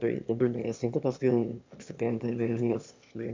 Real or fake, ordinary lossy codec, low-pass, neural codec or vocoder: fake; MP3, 64 kbps; 7.2 kHz; autoencoder, 22.05 kHz, a latent of 192 numbers a frame, VITS, trained on one speaker